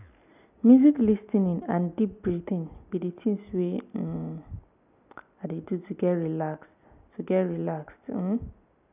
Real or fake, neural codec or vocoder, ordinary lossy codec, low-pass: real; none; none; 3.6 kHz